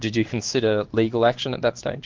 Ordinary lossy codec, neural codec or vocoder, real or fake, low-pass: Opus, 32 kbps; codec, 16 kHz in and 24 kHz out, 1 kbps, XY-Tokenizer; fake; 7.2 kHz